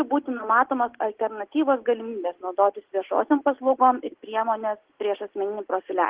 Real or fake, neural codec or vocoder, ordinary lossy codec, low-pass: real; none; Opus, 16 kbps; 3.6 kHz